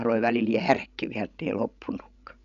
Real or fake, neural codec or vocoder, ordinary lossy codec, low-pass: fake; codec, 16 kHz, 16 kbps, FreqCodec, larger model; none; 7.2 kHz